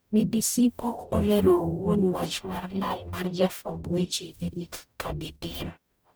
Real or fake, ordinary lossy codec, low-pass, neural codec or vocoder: fake; none; none; codec, 44.1 kHz, 0.9 kbps, DAC